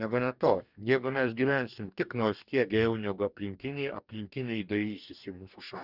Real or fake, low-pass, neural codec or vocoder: fake; 5.4 kHz; codec, 44.1 kHz, 2.6 kbps, DAC